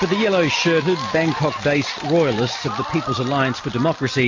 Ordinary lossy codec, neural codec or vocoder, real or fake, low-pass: MP3, 32 kbps; none; real; 7.2 kHz